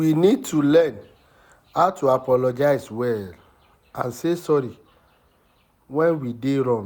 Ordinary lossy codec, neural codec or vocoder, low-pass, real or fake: none; none; none; real